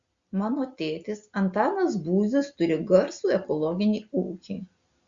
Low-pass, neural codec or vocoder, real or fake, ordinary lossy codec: 7.2 kHz; none; real; Opus, 64 kbps